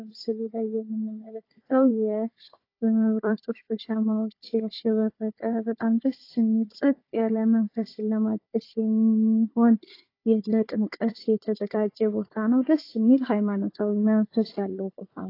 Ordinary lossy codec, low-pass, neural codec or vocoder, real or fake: AAC, 32 kbps; 5.4 kHz; codec, 16 kHz, 4 kbps, FunCodec, trained on Chinese and English, 50 frames a second; fake